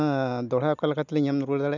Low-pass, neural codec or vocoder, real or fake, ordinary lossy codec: 7.2 kHz; none; real; none